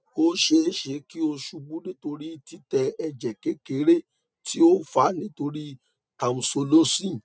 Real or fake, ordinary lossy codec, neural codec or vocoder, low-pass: real; none; none; none